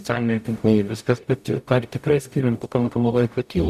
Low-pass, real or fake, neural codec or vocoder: 14.4 kHz; fake; codec, 44.1 kHz, 0.9 kbps, DAC